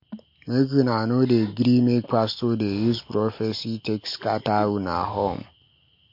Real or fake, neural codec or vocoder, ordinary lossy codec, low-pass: real; none; MP3, 32 kbps; 5.4 kHz